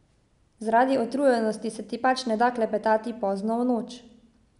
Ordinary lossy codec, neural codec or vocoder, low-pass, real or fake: none; none; 10.8 kHz; real